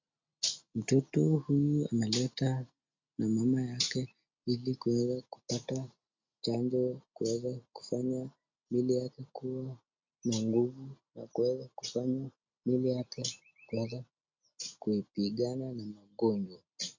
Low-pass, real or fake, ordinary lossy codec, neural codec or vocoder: 7.2 kHz; real; MP3, 64 kbps; none